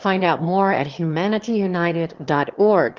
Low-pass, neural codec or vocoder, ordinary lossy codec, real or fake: 7.2 kHz; autoencoder, 22.05 kHz, a latent of 192 numbers a frame, VITS, trained on one speaker; Opus, 16 kbps; fake